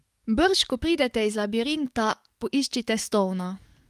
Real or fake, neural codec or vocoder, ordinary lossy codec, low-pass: fake; codec, 44.1 kHz, 7.8 kbps, DAC; Opus, 32 kbps; 14.4 kHz